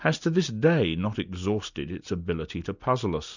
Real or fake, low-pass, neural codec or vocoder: real; 7.2 kHz; none